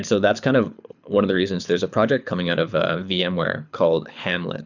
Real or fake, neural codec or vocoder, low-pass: fake; codec, 24 kHz, 6 kbps, HILCodec; 7.2 kHz